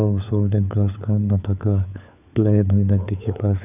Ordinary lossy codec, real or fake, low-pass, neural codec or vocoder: none; fake; 3.6 kHz; codec, 16 kHz, 4 kbps, FunCodec, trained on LibriTTS, 50 frames a second